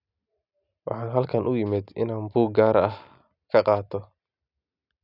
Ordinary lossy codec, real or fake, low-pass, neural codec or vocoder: none; real; 5.4 kHz; none